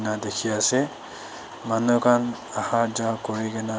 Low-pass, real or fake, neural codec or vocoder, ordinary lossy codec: none; real; none; none